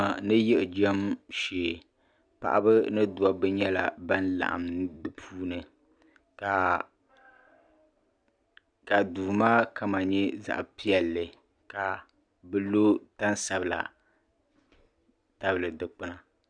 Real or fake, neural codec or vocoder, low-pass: real; none; 9.9 kHz